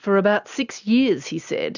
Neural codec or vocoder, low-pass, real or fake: none; 7.2 kHz; real